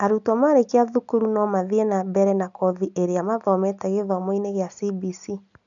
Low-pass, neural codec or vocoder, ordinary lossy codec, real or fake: 7.2 kHz; none; none; real